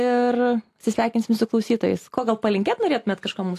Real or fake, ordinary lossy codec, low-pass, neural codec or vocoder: real; AAC, 64 kbps; 14.4 kHz; none